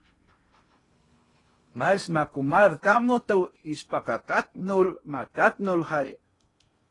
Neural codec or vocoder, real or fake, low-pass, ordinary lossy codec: codec, 16 kHz in and 24 kHz out, 0.8 kbps, FocalCodec, streaming, 65536 codes; fake; 10.8 kHz; AAC, 32 kbps